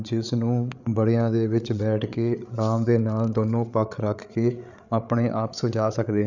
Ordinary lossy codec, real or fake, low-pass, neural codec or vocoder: none; fake; 7.2 kHz; codec, 16 kHz, 8 kbps, FreqCodec, larger model